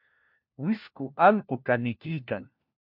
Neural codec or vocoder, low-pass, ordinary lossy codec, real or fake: codec, 16 kHz, 1 kbps, FunCodec, trained on LibriTTS, 50 frames a second; 5.4 kHz; MP3, 48 kbps; fake